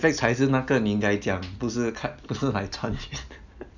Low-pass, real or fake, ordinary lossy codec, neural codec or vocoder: 7.2 kHz; real; none; none